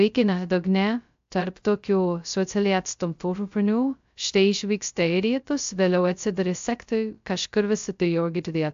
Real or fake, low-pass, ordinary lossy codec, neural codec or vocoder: fake; 7.2 kHz; AAC, 64 kbps; codec, 16 kHz, 0.2 kbps, FocalCodec